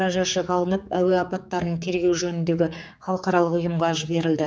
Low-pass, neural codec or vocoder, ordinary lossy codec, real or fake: none; codec, 16 kHz, 4 kbps, X-Codec, HuBERT features, trained on general audio; none; fake